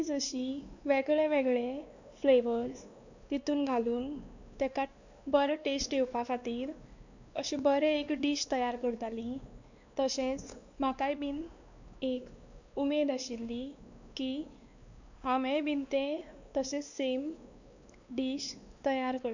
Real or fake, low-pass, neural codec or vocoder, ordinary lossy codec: fake; 7.2 kHz; codec, 16 kHz, 2 kbps, X-Codec, WavLM features, trained on Multilingual LibriSpeech; none